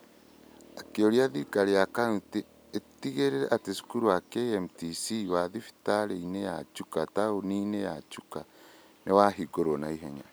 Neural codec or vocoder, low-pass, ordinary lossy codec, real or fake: none; none; none; real